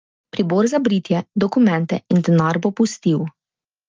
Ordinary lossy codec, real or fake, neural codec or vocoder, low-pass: Opus, 24 kbps; real; none; 7.2 kHz